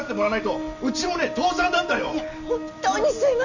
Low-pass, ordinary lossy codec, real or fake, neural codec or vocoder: 7.2 kHz; none; real; none